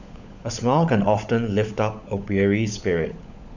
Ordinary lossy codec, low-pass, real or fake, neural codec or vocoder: none; 7.2 kHz; fake; codec, 16 kHz, 16 kbps, FunCodec, trained on LibriTTS, 50 frames a second